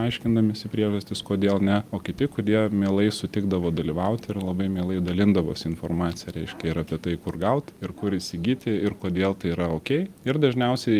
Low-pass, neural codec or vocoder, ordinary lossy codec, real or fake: 14.4 kHz; none; Opus, 32 kbps; real